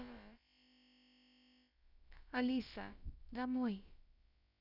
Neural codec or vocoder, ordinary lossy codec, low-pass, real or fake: codec, 16 kHz, about 1 kbps, DyCAST, with the encoder's durations; none; 5.4 kHz; fake